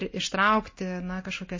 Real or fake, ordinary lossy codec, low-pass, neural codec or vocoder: real; MP3, 32 kbps; 7.2 kHz; none